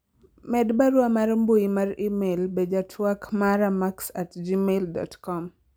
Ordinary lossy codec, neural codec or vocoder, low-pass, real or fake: none; none; none; real